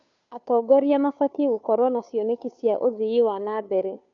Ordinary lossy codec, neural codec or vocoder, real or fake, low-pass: none; codec, 16 kHz, 2 kbps, FunCodec, trained on Chinese and English, 25 frames a second; fake; 7.2 kHz